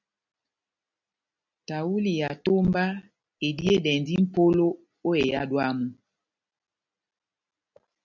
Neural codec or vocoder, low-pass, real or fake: none; 7.2 kHz; real